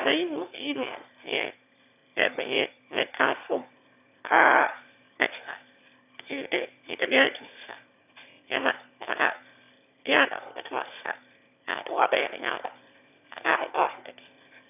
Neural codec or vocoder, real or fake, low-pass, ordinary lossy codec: autoencoder, 22.05 kHz, a latent of 192 numbers a frame, VITS, trained on one speaker; fake; 3.6 kHz; none